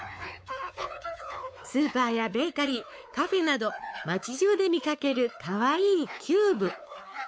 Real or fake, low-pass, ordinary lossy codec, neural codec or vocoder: fake; none; none; codec, 16 kHz, 4 kbps, X-Codec, WavLM features, trained on Multilingual LibriSpeech